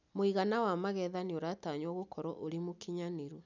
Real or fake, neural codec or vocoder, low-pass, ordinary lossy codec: real; none; 7.2 kHz; none